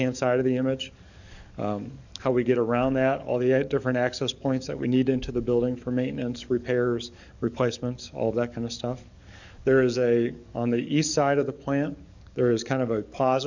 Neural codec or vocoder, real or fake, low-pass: codec, 44.1 kHz, 7.8 kbps, DAC; fake; 7.2 kHz